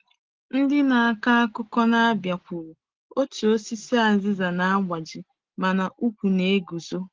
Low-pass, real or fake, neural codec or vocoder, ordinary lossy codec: 7.2 kHz; real; none; Opus, 16 kbps